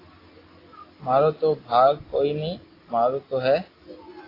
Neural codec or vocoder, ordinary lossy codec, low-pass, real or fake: none; AAC, 24 kbps; 5.4 kHz; real